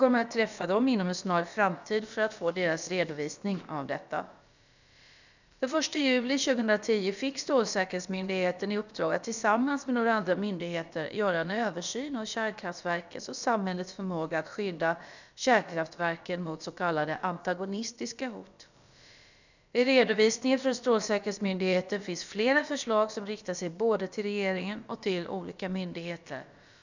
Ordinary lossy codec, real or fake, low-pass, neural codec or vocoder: none; fake; 7.2 kHz; codec, 16 kHz, about 1 kbps, DyCAST, with the encoder's durations